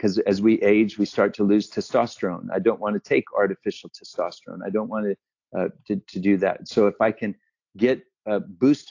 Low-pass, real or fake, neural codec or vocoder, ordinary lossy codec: 7.2 kHz; real; none; AAC, 48 kbps